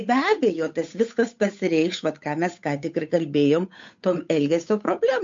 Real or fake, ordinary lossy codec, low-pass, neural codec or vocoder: fake; MP3, 48 kbps; 7.2 kHz; codec, 16 kHz, 8 kbps, FunCodec, trained on Chinese and English, 25 frames a second